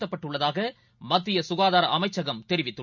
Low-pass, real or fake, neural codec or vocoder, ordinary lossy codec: 7.2 kHz; real; none; none